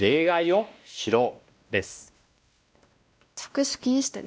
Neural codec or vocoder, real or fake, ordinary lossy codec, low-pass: codec, 16 kHz, 1 kbps, X-Codec, WavLM features, trained on Multilingual LibriSpeech; fake; none; none